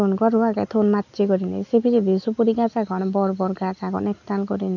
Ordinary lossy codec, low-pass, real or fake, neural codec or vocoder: none; 7.2 kHz; real; none